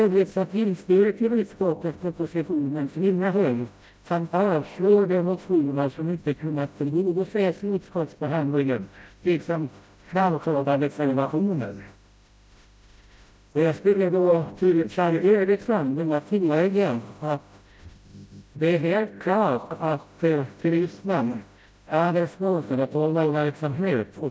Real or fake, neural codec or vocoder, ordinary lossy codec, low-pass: fake; codec, 16 kHz, 0.5 kbps, FreqCodec, smaller model; none; none